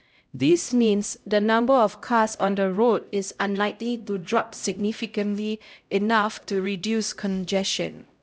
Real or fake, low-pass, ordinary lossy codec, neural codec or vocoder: fake; none; none; codec, 16 kHz, 0.5 kbps, X-Codec, HuBERT features, trained on LibriSpeech